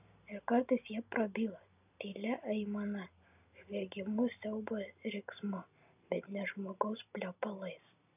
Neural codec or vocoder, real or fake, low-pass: none; real; 3.6 kHz